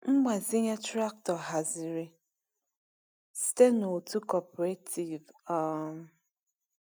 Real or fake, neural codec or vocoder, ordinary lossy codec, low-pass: real; none; none; none